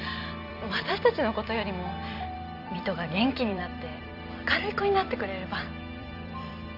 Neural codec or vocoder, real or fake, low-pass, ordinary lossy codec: vocoder, 44.1 kHz, 128 mel bands every 256 samples, BigVGAN v2; fake; 5.4 kHz; none